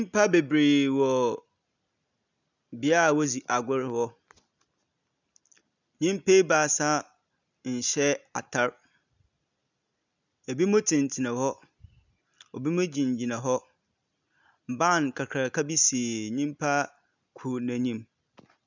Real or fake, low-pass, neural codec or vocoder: real; 7.2 kHz; none